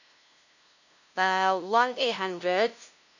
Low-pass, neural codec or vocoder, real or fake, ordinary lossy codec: 7.2 kHz; codec, 16 kHz, 0.5 kbps, FunCodec, trained on LibriTTS, 25 frames a second; fake; none